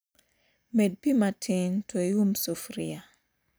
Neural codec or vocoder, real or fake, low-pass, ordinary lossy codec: none; real; none; none